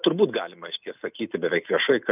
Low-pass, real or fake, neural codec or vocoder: 3.6 kHz; real; none